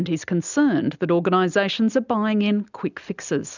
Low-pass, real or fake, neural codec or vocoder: 7.2 kHz; real; none